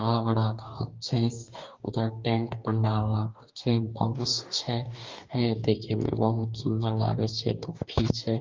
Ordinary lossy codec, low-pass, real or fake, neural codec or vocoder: Opus, 24 kbps; 7.2 kHz; fake; codec, 44.1 kHz, 2.6 kbps, DAC